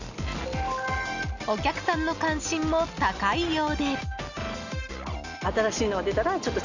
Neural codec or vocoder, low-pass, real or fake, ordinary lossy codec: none; 7.2 kHz; real; none